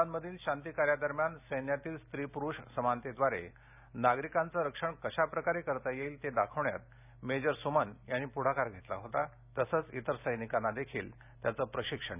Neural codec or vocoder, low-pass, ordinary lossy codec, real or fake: none; 3.6 kHz; none; real